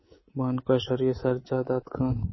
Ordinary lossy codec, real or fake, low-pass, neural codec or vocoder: MP3, 24 kbps; fake; 7.2 kHz; codec, 24 kHz, 3.1 kbps, DualCodec